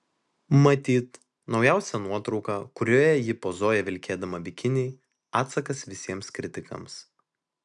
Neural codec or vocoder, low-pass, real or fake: none; 10.8 kHz; real